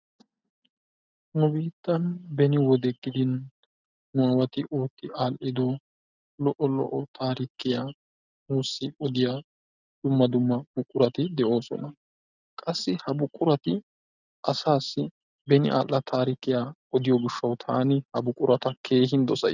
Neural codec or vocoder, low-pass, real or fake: none; 7.2 kHz; real